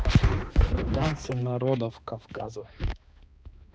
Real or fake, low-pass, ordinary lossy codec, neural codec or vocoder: fake; none; none; codec, 16 kHz, 2 kbps, X-Codec, HuBERT features, trained on balanced general audio